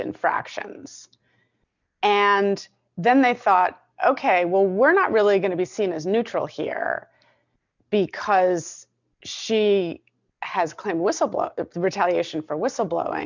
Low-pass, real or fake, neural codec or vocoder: 7.2 kHz; real; none